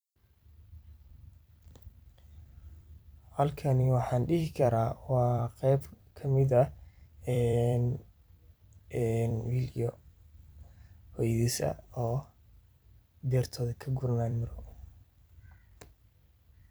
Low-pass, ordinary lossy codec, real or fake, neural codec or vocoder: none; none; fake; vocoder, 44.1 kHz, 128 mel bands every 256 samples, BigVGAN v2